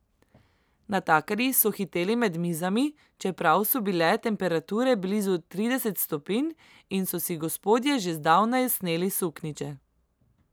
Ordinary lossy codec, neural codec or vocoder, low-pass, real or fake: none; none; none; real